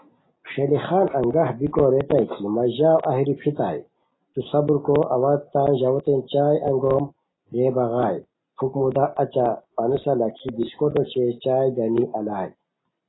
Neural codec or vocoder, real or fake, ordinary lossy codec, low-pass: none; real; AAC, 16 kbps; 7.2 kHz